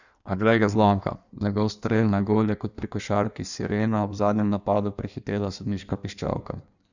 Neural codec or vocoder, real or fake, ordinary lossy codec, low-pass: codec, 16 kHz in and 24 kHz out, 1.1 kbps, FireRedTTS-2 codec; fake; none; 7.2 kHz